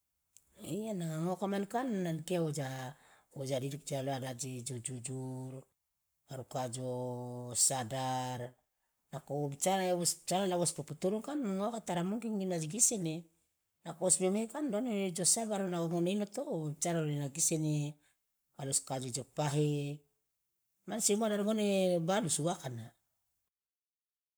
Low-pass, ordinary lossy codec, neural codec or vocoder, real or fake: none; none; codec, 44.1 kHz, 7.8 kbps, Pupu-Codec; fake